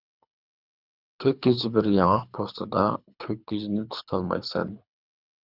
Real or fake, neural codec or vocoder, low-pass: fake; codec, 24 kHz, 3 kbps, HILCodec; 5.4 kHz